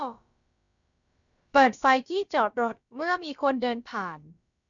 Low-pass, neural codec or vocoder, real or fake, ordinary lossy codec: 7.2 kHz; codec, 16 kHz, about 1 kbps, DyCAST, with the encoder's durations; fake; none